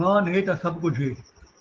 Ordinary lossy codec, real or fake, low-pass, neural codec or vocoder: Opus, 16 kbps; real; 7.2 kHz; none